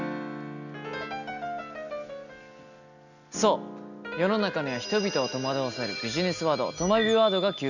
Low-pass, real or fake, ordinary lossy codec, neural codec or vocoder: 7.2 kHz; real; none; none